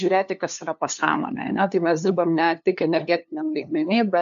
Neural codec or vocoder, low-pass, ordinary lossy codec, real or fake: codec, 16 kHz, 2 kbps, FunCodec, trained on LibriTTS, 25 frames a second; 7.2 kHz; AAC, 96 kbps; fake